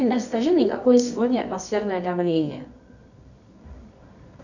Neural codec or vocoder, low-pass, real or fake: codec, 24 kHz, 0.9 kbps, WavTokenizer, medium music audio release; 7.2 kHz; fake